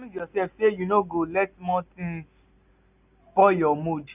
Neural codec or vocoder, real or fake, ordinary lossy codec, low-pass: none; real; none; 3.6 kHz